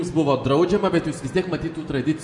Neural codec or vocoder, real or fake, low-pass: none; real; 10.8 kHz